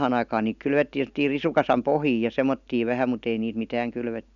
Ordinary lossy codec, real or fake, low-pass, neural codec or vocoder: none; real; 7.2 kHz; none